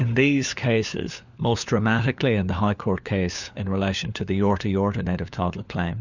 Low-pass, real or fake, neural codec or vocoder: 7.2 kHz; fake; codec, 16 kHz, 4 kbps, FunCodec, trained on LibriTTS, 50 frames a second